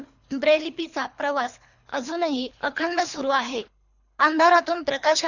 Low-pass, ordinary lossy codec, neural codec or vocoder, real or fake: 7.2 kHz; none; codec, 24 kHz, 3 kbps, HILCodec; fake